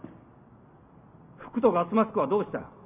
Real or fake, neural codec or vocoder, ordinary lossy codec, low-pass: real; none; none; 3.6 kHz